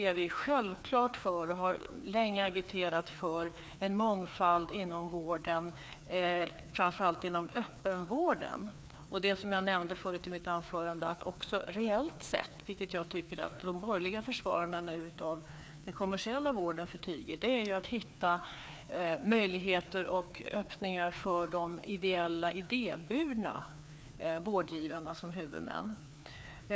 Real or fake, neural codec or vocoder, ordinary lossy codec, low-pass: fake; codec, 16 kHz, 2 kbps, FreqCodec, larger model; none; none